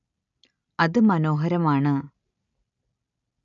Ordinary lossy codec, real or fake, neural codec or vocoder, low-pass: none; real; none; 7.2 kHz